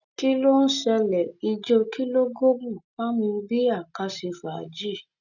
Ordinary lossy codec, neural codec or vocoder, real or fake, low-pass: none; none; real; 7.2 kHz